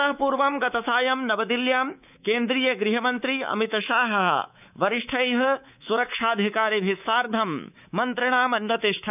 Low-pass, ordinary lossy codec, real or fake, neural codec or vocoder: 3.6 kHz; none; fake; codec, 24 kHz, 3.1 kbps, DualCodec